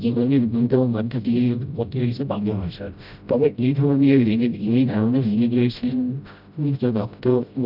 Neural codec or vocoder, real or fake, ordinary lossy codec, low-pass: codec, 16 kHz, 0.5 kbps, FreqCodec, smaller model; fake; none; 5.4 kHz